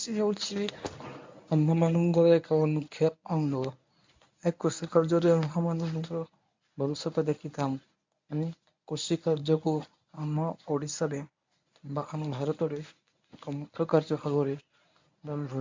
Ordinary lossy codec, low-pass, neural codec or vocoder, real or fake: MP3, 64 kbps; 7.2 kHz; codec, 24 kHz, 0.9 kbps, WavTokenizer, medium speech release version 1; fake